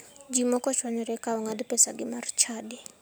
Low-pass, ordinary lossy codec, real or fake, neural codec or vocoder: none; none; real; none